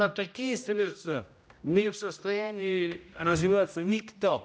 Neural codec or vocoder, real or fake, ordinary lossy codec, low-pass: codec, 16 kHz, 0.5 kbps, X-Codec, HuBERT features, trained on general audio; fake; none; none